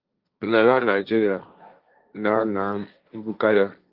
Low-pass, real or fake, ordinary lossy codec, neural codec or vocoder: 5.4 kHz; fake; Opus, 24 kbps; codec, 16 kHz, 1.1 kbps, Voila-Tokenizer